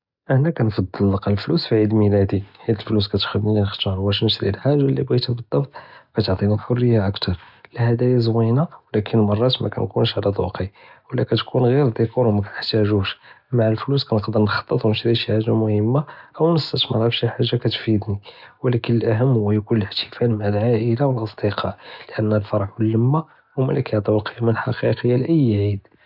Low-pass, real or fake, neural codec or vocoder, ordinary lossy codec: 5.4 kHz; real; none; none